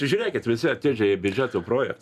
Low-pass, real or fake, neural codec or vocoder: 14.4 kHz; real; none